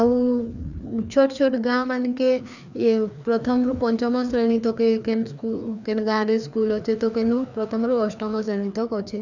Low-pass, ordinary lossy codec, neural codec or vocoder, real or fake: 7.2 kHz; none; codec, 16 kHz, 2 kbps, FreqCodec, larger model; fake